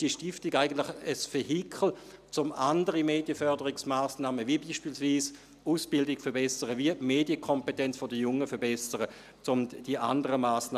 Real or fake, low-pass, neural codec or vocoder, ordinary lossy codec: real; 14.4 kHz; none; none